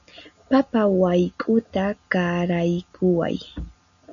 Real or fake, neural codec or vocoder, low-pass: real; none; 7.2 kHz